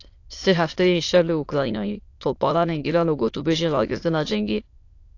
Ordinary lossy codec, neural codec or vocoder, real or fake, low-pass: AAC, 48 kbps; autoencoder, 22.05 kHz, a latent of 192 numbers a frame, VITS, trained on many speakers; fake; 7.2 kHz